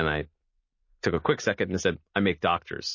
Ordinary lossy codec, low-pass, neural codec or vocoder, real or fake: MP3, 32 kbps; 7.2 kHz; none; real